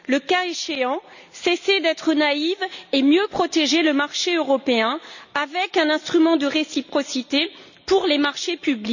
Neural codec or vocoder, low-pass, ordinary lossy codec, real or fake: none; 7.2 kHz; none; real